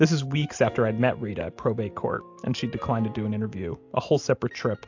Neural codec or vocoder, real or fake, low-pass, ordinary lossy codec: none; real; 7.2 kHz; AAC, 48 kbps